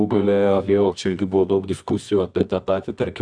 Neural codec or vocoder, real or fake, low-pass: codec, 24 kHz, 0.9 kbps, WavTokenizer, medium music audio release; fake; 9.9 kHz